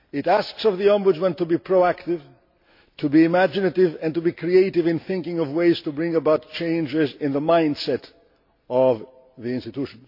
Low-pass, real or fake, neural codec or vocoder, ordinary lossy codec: 5.4 kHz; real; none; MP3, 32 kbps